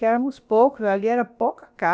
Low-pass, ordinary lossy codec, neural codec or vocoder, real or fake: none; none; codec, 16 kHz, about 1 kbps, DyCAST, with the encoder's durations; fake